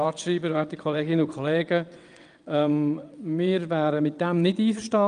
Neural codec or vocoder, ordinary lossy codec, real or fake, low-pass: vocoder, 22.05 kHz, 80 mel bands, WaveNeXt; Opus, 32 kbps; fake; 9.9 kHz